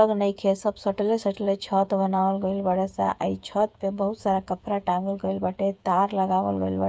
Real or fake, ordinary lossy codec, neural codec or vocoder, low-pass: fake; none; codec, 16 kHz, 8 kbps, FreqCodec, smaller model; none